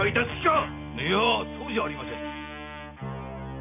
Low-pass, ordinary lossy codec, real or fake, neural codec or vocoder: 3.6 kHz; none; real; none